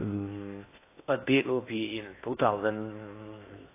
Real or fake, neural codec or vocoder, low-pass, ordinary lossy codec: fake; codec, 16 kHz in and 24 kHz out, 0.6 kbps, FocalCodec, streaming, 4096 codes; 3.6 kHz; AAC, 24 kbps